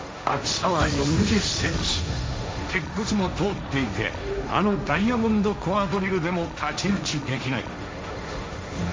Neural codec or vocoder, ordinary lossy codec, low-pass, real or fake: codec, 16 kHz, 1.1 kbps, Voila-Tokenizer; none; none; fake